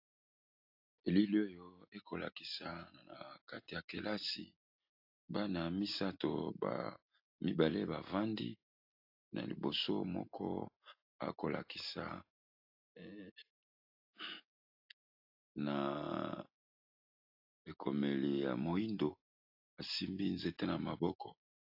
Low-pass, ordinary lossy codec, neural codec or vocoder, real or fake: 5.4 kHz; AAC, 48 kbps; none; real